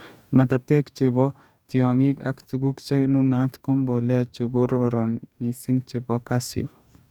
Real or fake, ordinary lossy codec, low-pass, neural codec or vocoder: fake; none; 19.8 kHz; codec, 44.1 kHz, 2.6 kbps, DAC